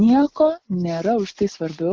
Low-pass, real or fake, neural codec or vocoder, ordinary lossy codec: 7.2 kHz; real; none; Opus, 32 kbps